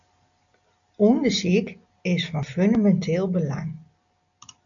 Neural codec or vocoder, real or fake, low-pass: none; real; 7.2 kHz